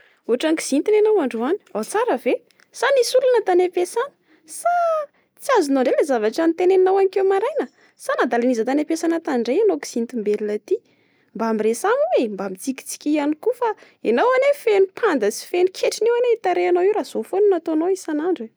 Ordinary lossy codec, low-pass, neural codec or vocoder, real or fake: none; none; none; real